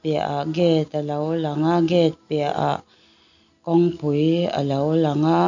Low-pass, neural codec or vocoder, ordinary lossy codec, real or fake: 7.2 kHz; none; none; real